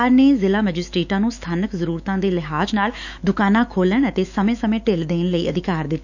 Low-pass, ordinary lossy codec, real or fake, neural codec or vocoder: 7.2 kHz; none; fake; autoencoder, 48 kHz, 128 numbers a frame, DAC-VAE, trained on Japanese speech